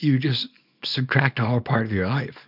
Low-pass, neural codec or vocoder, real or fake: 5.4 kHz; codec, 24 kHz, 0.9 kbps, WavTokenizer, small release; fake